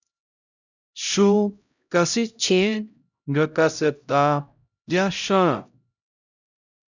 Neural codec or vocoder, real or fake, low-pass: codec, 16 kHz, 0.5 kbps, X-Codec, HuBERT features, trained on LibriSpeech; fake; 7.2 kHz